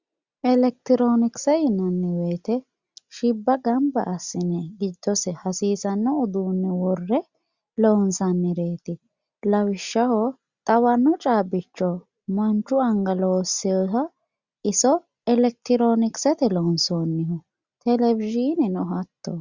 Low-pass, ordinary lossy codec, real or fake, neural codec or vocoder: 7.2 kHz; Opus, 64 kbps; real; none